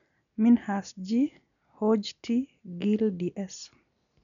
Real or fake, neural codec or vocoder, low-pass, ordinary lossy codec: real; none; 7.2 kHz; none